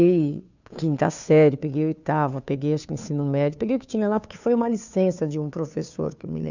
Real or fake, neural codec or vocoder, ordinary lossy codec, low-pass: fake; codec, 16 kHz, 2 kbps, FunCodec, trained on Chinese and English, 25 frames a second; none; 7.2 kHz